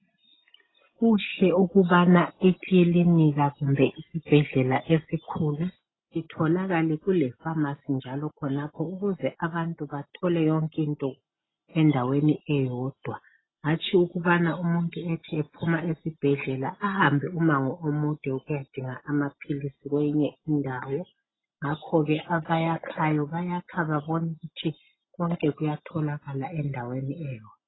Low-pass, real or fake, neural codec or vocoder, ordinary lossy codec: 7.2 kHz; real; none; AAC, 16 kbps